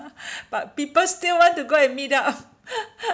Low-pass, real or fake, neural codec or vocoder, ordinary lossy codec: none; real; none; none